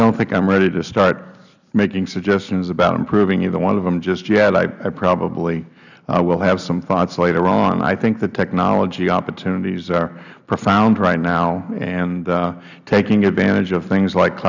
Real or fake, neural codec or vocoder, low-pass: real; none; 7.2 kHz